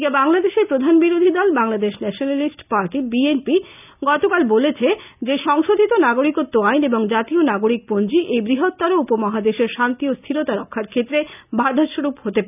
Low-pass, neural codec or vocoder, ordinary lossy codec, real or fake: 3.6 kHz; none; none; real